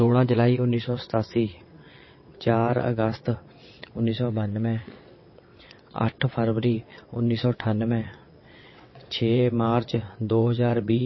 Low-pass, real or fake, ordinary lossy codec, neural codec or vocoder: 7.2 kHz; fake; MP3, 24 kbps; vocoder, 22.05 kHz, 80 mel bands, WaveNeXt